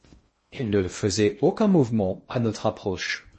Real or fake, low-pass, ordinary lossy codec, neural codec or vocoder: fake; 10.8 kHz; MP3, 32 kbps; codec, 16 kHz in and 24 kHz out, 0.6 kbps, FocalCodec, streaming, 2048 codes